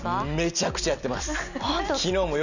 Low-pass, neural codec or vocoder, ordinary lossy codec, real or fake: 7.2 kHz; none; none; real